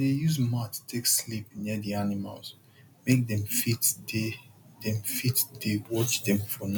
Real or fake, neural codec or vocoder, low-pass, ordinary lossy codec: real; none; none; none